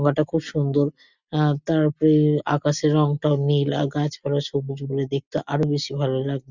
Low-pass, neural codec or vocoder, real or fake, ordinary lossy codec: 7.2 kHz; none; real; Opus, 64 kbps